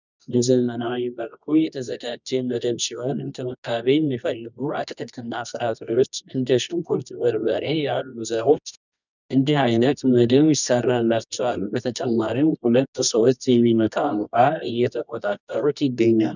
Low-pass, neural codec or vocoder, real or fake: 7.2 kHz; codec, 24 kHz, 0.9 kbps, WavTokenizer, medium music audio release; fake